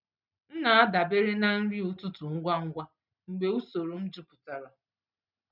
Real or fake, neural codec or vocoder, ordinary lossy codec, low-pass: real; none; none; 5.4 kHz